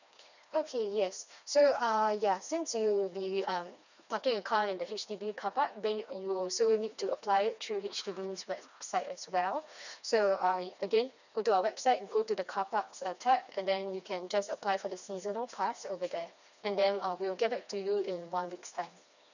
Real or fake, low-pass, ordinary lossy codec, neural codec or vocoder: fake; 7.2 kHz; none; codec, 16 kHz, 2 kbps, FreqCodec, smaller model